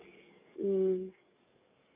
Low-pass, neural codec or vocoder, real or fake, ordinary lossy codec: 3.6 kHz; codec, 44.1 kHz, 7.8 kbps, DAC; fake; AAC, 32 kbps